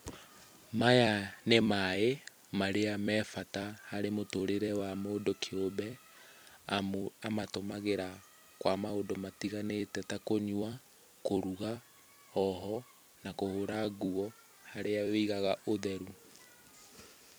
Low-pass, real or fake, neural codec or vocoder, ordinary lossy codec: none; fake; vocoder, 44.1 kHz, 128 mel bands every 256 samples, BigVGAN v2; none